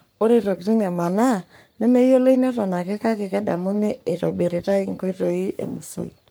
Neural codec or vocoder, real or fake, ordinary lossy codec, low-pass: codec, 44.1 kHz, 3.4 kbps, Pupu-Codec; fake; none; none